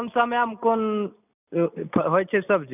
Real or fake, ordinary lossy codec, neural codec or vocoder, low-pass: real; none; none; 3.6 kHz